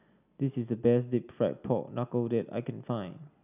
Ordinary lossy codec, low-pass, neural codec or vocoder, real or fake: none; 3.6 kHz; none; real